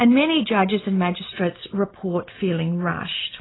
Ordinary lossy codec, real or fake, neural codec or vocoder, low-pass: AAC, 16 kbps; real; none; 7.2 kHz